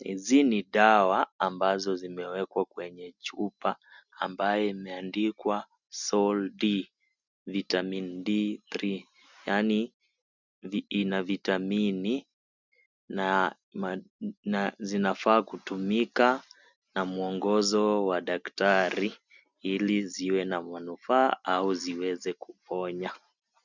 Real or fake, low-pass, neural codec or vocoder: real; 7.2 kHz; none